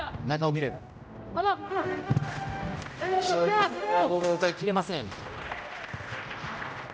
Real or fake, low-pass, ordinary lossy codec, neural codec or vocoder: fake; none; none; codec, 16 kHz, 0.5 kbps, X-Codec, HuBERT features, trained on general audio